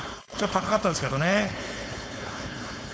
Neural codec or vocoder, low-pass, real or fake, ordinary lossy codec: codec, 16 kHz, 4.8 kbps, FACodec; none; fake; none